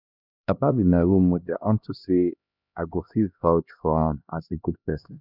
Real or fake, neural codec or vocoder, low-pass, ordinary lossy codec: fake; codec, 16 kHz, 1 kbps, X-Codec, HuBERT features, trained on LibriSpeech; 5.4 kHz; none